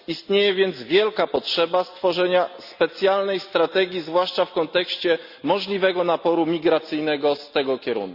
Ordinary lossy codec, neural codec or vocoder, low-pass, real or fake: Opus, 64 kbps; none; 5.4 kHz; real